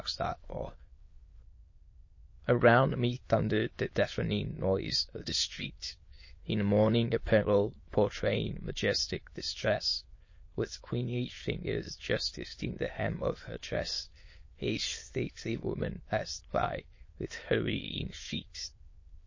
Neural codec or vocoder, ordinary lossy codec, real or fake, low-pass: autoencoder, 22.05 kHz, a latent of 192 numbers a frame, VITS, trained on many speakers; MP3, 32 kbps; fake; 7.2 kHz